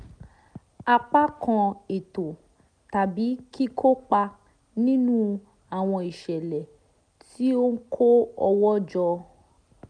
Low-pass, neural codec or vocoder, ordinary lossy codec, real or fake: 9.9 kHz; none; none; real